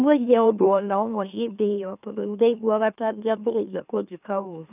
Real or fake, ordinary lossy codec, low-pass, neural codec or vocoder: fake; none; 3.6 kHz; autoencoder, 44.1 kHz, a latent of 192 numbers a frame, MeloTTS